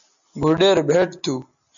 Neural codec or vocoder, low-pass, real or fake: none; 7.2 kHz; real